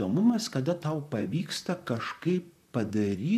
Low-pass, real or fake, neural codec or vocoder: 14.4 kHz; fake; vocoder, 44.1 kHz, 128 mel bands, Pupu-Vocoder